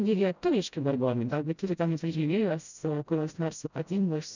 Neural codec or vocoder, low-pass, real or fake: codec, 16 kHz, 0.5 kbps, FreqCodec, smaller model; 7.2 kHz; fake